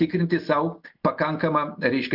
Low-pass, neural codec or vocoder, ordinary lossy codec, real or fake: 5.4 kHz; none; MP3, 48 kbps; real